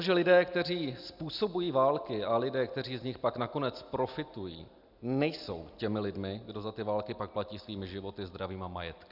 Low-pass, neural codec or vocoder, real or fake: 5.4 kHz; none; real